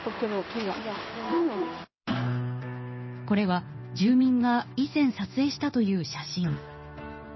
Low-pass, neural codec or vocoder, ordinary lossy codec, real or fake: 7.2 kHz; codec, 16 kHz in and 24 kHz out, 1 kbps, XY-Tokenizer; MP3, 24 kbps; fake